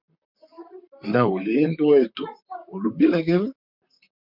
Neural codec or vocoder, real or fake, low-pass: vocoder, 44.1 kHz, 128 mel bands, Pupu-Vocoder; fake; 5.4 kHz